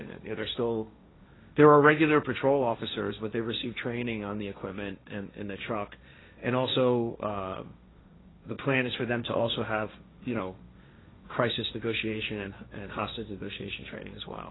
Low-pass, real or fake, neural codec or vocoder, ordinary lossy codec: 7.2 kHz; fake; codec, 16 kHz, 1.1 kbps, Voila-Tokenizer; AAC, 16 kbps